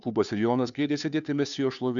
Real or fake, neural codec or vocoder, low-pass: fake; codec, 16 kHz, 2 kbps, FunCodec, trained on Chinese and English, 25 frames a second; 7.2 kHz